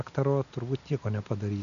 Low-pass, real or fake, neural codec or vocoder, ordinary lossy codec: 7.2 kHz; real; none; AAC, 96 kbps